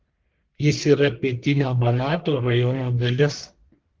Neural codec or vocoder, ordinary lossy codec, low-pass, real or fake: codec, 44.1 kHz, 1.7 kbps, Pupu-Codec; Opus, 16 kbps; 7.2 kHz; fake